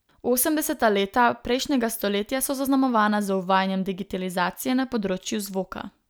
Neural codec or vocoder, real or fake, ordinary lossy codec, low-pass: none; real; none; none